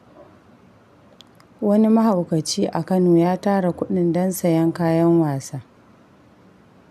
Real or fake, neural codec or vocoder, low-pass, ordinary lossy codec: real; none; 14.4 kHz; none